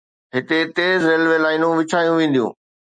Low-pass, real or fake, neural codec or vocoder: 9.9 kHz; real; none